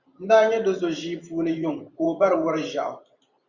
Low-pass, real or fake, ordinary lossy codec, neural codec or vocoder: 7.2 kHz; fake; Opus, 64 kbps; vocoder, 44.1 kHz, 128 mel bands every 512 samples, BigVGAN v2